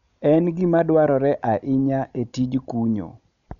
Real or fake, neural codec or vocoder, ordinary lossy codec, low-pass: real; none; none; 7.2 kHz